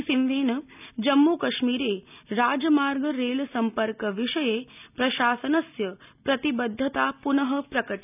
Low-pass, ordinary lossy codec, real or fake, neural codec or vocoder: 3.6 kHz; none; real; none